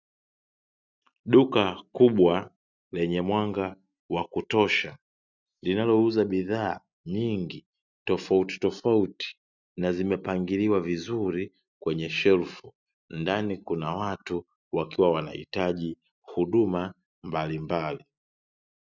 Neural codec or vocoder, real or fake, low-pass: none; real; 7.2 kHz